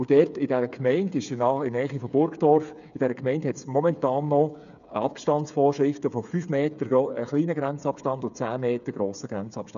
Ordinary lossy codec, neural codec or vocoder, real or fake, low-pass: none; codec, 16 kHz, 8 kbps, FreqCodec, smaller model; fake; 7.2 kHz